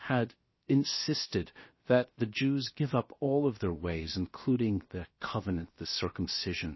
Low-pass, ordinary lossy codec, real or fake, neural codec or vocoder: 7.2 kHz; MP3, 24 kbps; fake; codec, 16 kHz, 0.7 kbps, FocalCodec